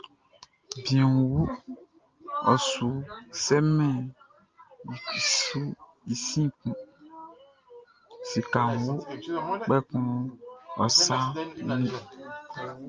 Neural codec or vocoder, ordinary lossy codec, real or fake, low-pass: none; Opus, 24 kbps; real; 7.2 kHz